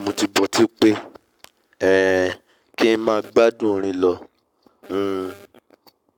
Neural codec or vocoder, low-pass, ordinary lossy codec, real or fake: codec, 44.1 kHz, 7.8 kbps, Pupu-Codec; 19.8 kHz; none; fake